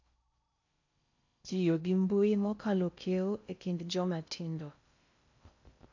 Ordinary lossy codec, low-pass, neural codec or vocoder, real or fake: AAC, 48 kbps; 7.2 kHz; codec, 16 kHz in and 24 kHz out, 0.6 kbps, FocalCodec, streaming, 4096 codes; fake